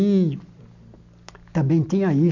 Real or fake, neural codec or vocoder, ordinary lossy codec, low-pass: real; none; none; 7.2 kHz